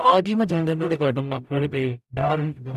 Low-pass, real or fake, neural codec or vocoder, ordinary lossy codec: 14.4 kHz; fake; codec, 44.1 kHz, 0.9 kbps, DAC; none